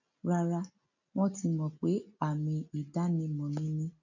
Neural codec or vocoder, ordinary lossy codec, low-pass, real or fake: none; none; 7.2 kHz; real